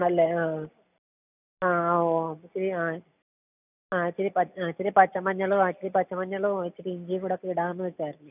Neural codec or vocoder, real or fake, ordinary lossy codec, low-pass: none; real; none; 3.6 kHz